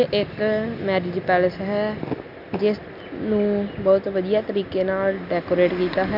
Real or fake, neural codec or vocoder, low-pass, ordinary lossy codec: real; none; 5.4 kHz; none